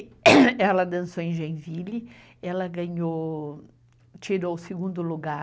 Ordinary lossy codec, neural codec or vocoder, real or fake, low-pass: none; none; real; none